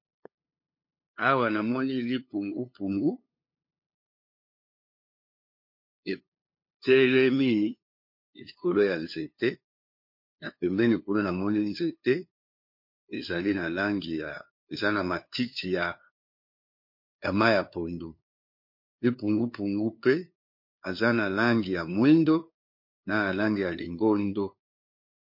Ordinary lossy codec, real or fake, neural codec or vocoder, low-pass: MP3, 32 kbps; fake; codec, 16 kHz, 2 kbps, FunCodec, trained on LibriTTS, 25 frames a second; 5.4 kHz